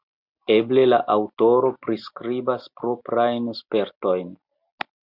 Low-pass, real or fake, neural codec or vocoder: 5.4 kHz; real; none